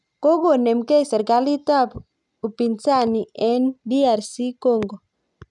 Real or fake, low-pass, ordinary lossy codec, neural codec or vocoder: real; 10.8 kHz; none; none